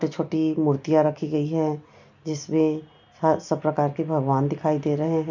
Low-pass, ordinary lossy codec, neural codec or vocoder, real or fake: 7.2 kHz; none; none; real